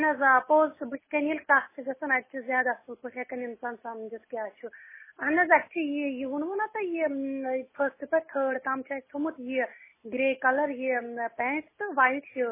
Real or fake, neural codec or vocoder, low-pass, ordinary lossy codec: real; none; 3.6 kHz; MP3, 16 kbps